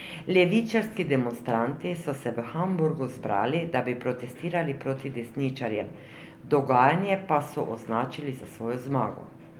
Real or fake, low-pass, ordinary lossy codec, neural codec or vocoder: real; 19.8 kHz; Opus, 32 kbps; none